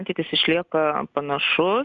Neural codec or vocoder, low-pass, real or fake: none; 7.2 kHz; real